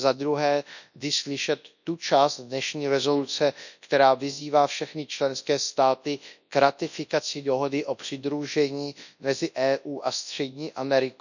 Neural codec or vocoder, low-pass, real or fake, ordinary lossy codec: codec, 24 kHz, 0.9 kbps, WavTokenizer, large speech release; 7.2 kHz; fake; none